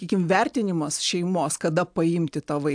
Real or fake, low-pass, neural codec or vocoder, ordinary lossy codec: real; 9.9 kHz; none; AAC, 64 kbps